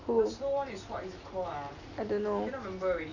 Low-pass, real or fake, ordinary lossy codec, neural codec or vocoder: 7.2 kHz; real; none; none